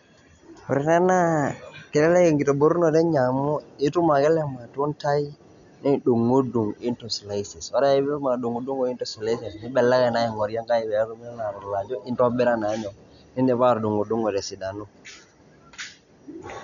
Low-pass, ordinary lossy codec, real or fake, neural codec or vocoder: 7.2 kHz; none; real; none